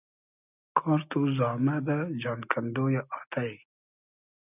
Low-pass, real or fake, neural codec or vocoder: 3.6 kHz; real; none